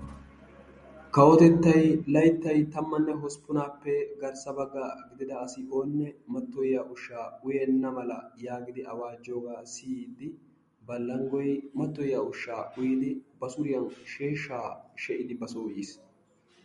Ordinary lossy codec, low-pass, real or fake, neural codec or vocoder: MP3, 48 kbps; 14.4 kHz; real; none